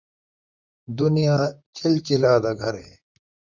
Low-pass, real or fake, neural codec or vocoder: 7.2 kHz; fake; vocoder, 44.1 kHz, 128 mel bands, Pupu-Vocoder